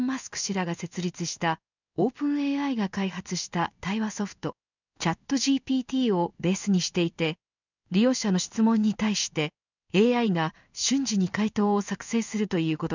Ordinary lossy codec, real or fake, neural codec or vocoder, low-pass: none; fake; codec, 16 kHz in and 24 kHz out, 1 kbps, XY-Tokenizer; 7.2 kHz